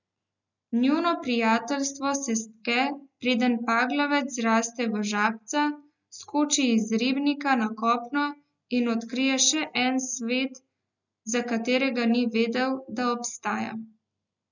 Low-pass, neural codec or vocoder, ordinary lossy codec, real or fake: 7.2 kHz; none; none; real